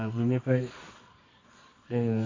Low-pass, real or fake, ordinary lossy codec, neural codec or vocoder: 7.2 kHz; fake; MP3, 32 kbps; codec, 24 kHz, 0.9 kbps, WavTokenizer, medium music audio release